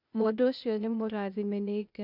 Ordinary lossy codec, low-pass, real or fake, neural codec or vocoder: none; 5.4 kHz; fake; codec, 16 kHz, 0.8 kbps, ZipCodec